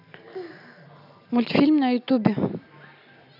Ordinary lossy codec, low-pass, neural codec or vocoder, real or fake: AAC, 48 kbps; 5.4 kHz; none; real